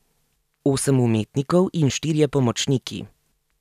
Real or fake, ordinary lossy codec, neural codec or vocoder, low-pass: real; none; none; 14.4 kHz